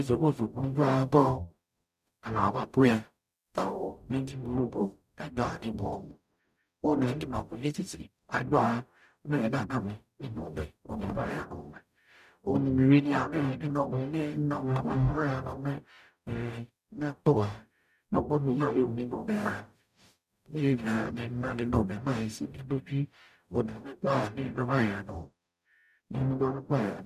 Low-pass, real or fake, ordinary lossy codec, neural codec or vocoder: 14.4 kHz; fake; none; codec, 44.1 kHz, 0.9 kbps, DAC